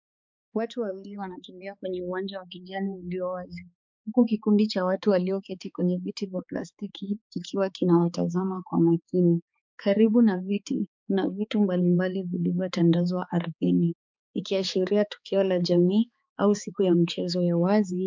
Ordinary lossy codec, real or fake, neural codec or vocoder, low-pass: MP3, 64 kbps; fake; codec, 16 kHz, 4 kbps, X-Codec, HuBERT features, trained on balanced general audio; 7.2 kHz